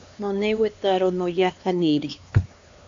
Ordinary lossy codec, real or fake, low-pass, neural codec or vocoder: MP3, 64 kbps; fake; 7.2 kHz; codec, 16 kHz, 2 kbps, X-Codec, HuBERT features, trained on LibriSpeech